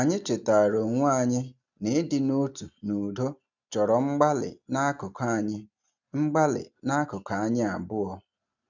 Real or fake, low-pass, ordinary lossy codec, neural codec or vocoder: real; 7.2 kHz; none; none